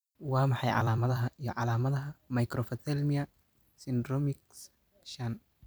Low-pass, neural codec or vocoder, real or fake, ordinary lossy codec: none; vocoder, 44.1 kHz, 128 mel bands every 256 samples, BigVGAN v2; fake; none